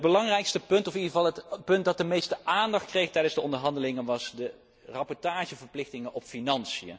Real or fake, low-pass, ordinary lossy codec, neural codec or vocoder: real; none; none; none